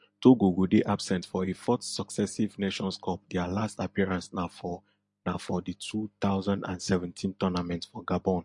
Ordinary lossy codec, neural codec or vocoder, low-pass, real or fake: MP3, 48 kbps; none; 10.8 kHz; real